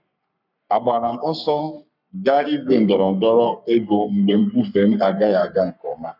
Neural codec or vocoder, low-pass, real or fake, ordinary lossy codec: codec, 44.1 kHz, 3.4 kbps, Pupu-Codec; 5.4 kHz; fake; none